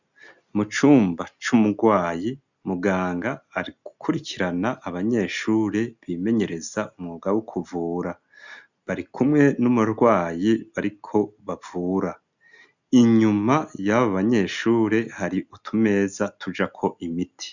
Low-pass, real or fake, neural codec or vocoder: 7.2 kHz; real; none